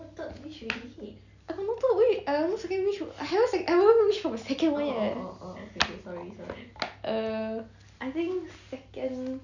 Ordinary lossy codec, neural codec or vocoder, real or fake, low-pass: none; none; real; 7.2 kHz